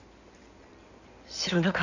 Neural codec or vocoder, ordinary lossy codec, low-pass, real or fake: codec, 16 kHz in and 24 kHz out, 2.2 kbps, FireRedTTS-2 codec; none; 7.2 kHz; fake